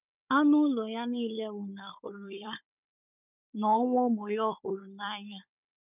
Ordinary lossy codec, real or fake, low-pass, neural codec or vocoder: none; fake; 3.6 kHz; codec, 16 kHz, 16 kbps, FunCodec, trained on Chinese and English, 50 frames a second